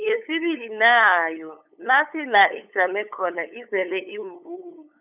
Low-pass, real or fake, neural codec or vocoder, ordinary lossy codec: 3.6 kHz; fake; codec, 16 kHz, 8 kbps, FunCodec, trained on LibriTTS, 25 frames a second; none